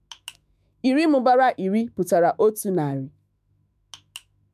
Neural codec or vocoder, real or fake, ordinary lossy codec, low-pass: autoencoder, 48 kHz, 128 numbers a frame, DAC-VAE, trained on Japanese speech; fake; MP3, 96 kbps; 14.4 kHz